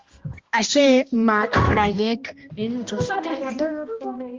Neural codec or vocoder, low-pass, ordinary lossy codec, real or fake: codec, 16 kHz, 1 kbps, X-Codec, HuBERT features, trained on balanced general audio; 7.2 kHz; Opus, 32 kbps; fake